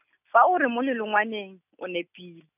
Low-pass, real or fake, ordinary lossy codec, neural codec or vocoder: 3.6 kHz; real; none; none